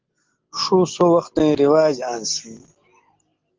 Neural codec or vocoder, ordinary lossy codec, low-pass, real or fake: none; Opus, 16 kbps; 7.2 kHz; real